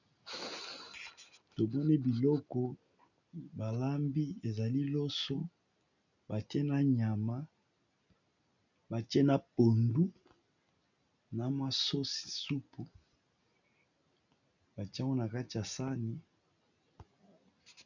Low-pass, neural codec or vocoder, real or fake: 7.2 kHz; none; real